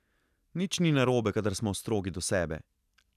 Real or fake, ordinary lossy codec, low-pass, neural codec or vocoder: real; none; 14.4 kHz; none